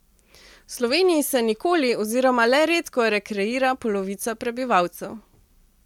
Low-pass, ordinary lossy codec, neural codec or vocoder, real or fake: 19.8 kHz; MP3, 96 kbps; none; real